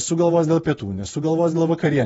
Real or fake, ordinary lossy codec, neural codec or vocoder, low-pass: fake; AAC, 24 kbps; vocoder, 48 kHz, 128 mel bands, Vocos; 19.8 kHz